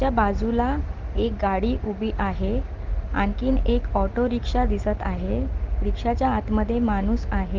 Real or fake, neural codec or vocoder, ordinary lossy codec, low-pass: real; none; Opus, 16 kbps; 7.2 kHz